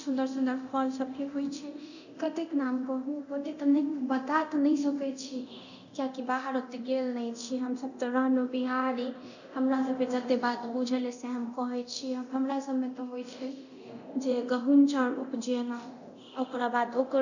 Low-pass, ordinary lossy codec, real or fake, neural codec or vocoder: 7.2 kHz; none; fake; codec, 24 kHz, 0.9 kbps, DualCodec